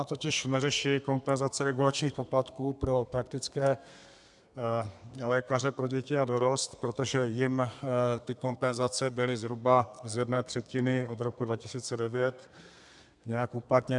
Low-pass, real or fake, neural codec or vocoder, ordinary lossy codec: 10.8 kHz; fake; codec, 32 kHz, 1.9 kbps, SNAC; MP3, 96 kbps